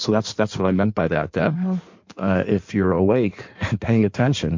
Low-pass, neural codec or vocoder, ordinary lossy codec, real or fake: 7.2 kHz; codec, 16 kHz in and 24 kHz out, 1.1 kbps, FireRedTTS-2 codec; MP3, 48 kbps; fake